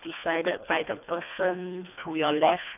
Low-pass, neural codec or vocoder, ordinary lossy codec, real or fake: 3.6 kHz; codec, 24 kHz, 1.5 kbps, HILCodec; none; fake